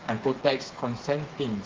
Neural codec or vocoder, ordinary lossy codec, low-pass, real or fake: codec, 16 kHz, 4 kbps, FreqCodec, smaller model; Opus, 16 kbps; 7.2 kHz; fake